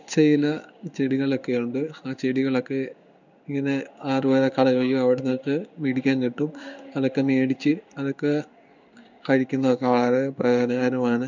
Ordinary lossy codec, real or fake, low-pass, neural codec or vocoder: none; fake; 7.2 kHz; codec, 16 kHz in and 24 kHz out, 1 kbps, XY-Tokenizer